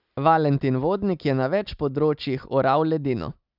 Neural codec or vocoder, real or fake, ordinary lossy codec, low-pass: none; real; none; 5.4 kHz